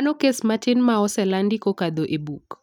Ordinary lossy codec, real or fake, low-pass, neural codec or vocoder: none; real; 19.8 kHz; none